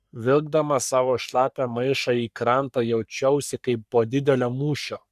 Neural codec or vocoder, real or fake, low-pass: codec, 44.1 kHz, 3.4 kbps, Pupu-Codec; fake; 14.4 kHz